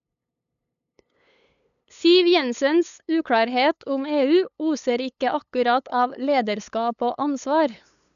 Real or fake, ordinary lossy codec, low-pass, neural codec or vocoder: fake; none; 7.2 kHz; codec, 16 kHz, 8 kbps, FunCodec, trained on LibriTTS, 25 frames a second